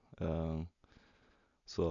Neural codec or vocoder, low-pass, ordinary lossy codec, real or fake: none; 7.2 kHz; none; real